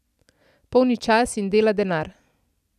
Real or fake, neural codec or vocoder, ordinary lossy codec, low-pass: real; none; none; 14.4 kHz